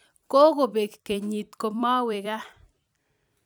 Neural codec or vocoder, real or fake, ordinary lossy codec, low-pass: vocoder, 44.1 kHz, 128 mel bands every 256 samples, BigVGAN v2; fake; none; none